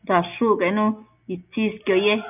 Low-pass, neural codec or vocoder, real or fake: 3.6 kHz; none; real